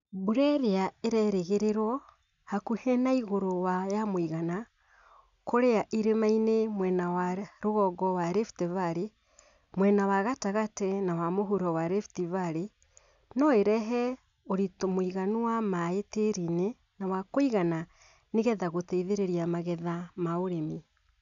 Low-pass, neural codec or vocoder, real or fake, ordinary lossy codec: 7.2 kHz; none; real; none